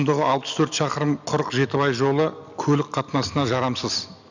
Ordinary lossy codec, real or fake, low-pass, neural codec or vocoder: none; real; 7.2 kHz; none